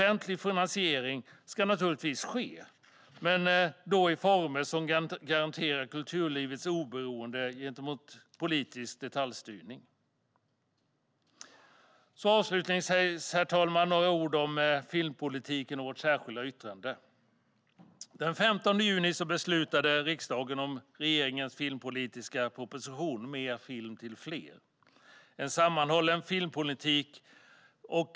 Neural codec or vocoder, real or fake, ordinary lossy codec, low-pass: none; real; none; none